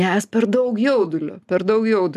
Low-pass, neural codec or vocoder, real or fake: 14.4 kHz; none; real